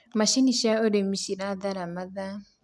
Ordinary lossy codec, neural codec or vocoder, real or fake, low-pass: none; none; real; none